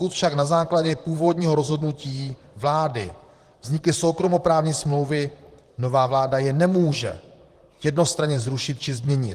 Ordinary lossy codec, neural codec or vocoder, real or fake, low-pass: Opus, 24 kbps; vocoder, 44.1 kHz, 128 mel bands, Pupu-Vocoder; fake; 14.4 kHz